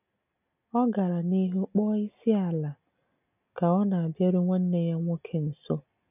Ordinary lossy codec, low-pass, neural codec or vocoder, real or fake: none; 3.6 kHz; none; real